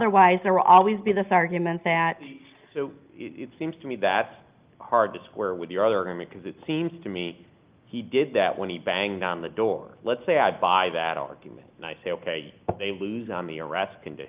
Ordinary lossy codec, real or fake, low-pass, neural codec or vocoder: Opus, 24 kbps; real; 3.6 kHz; none